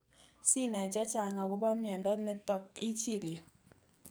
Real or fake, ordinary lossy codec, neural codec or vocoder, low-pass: fake; none; codec, 44.1 kHz, 2.6 kbps, SNAC; none